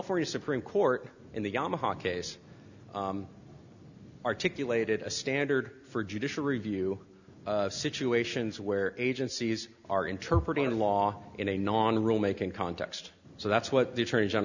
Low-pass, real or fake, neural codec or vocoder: 7.2 kHz; real; none